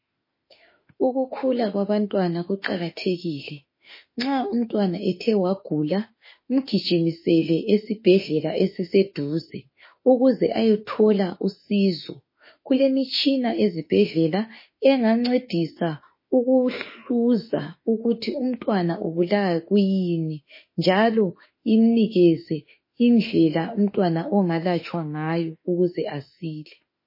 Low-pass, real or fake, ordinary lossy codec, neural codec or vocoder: 5.4 kHz; fake; MP3, 24 kbps; autoencoder, 48 kHz, 32 numbers a frame, DAC-VAE, trained on Japanese speech